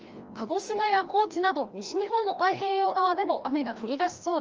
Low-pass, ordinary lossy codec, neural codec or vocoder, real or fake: 7.2 kHz; Opus, 24 kbps; codec, 16 kHz, 1 kbps, FreqCodec, larger model; fake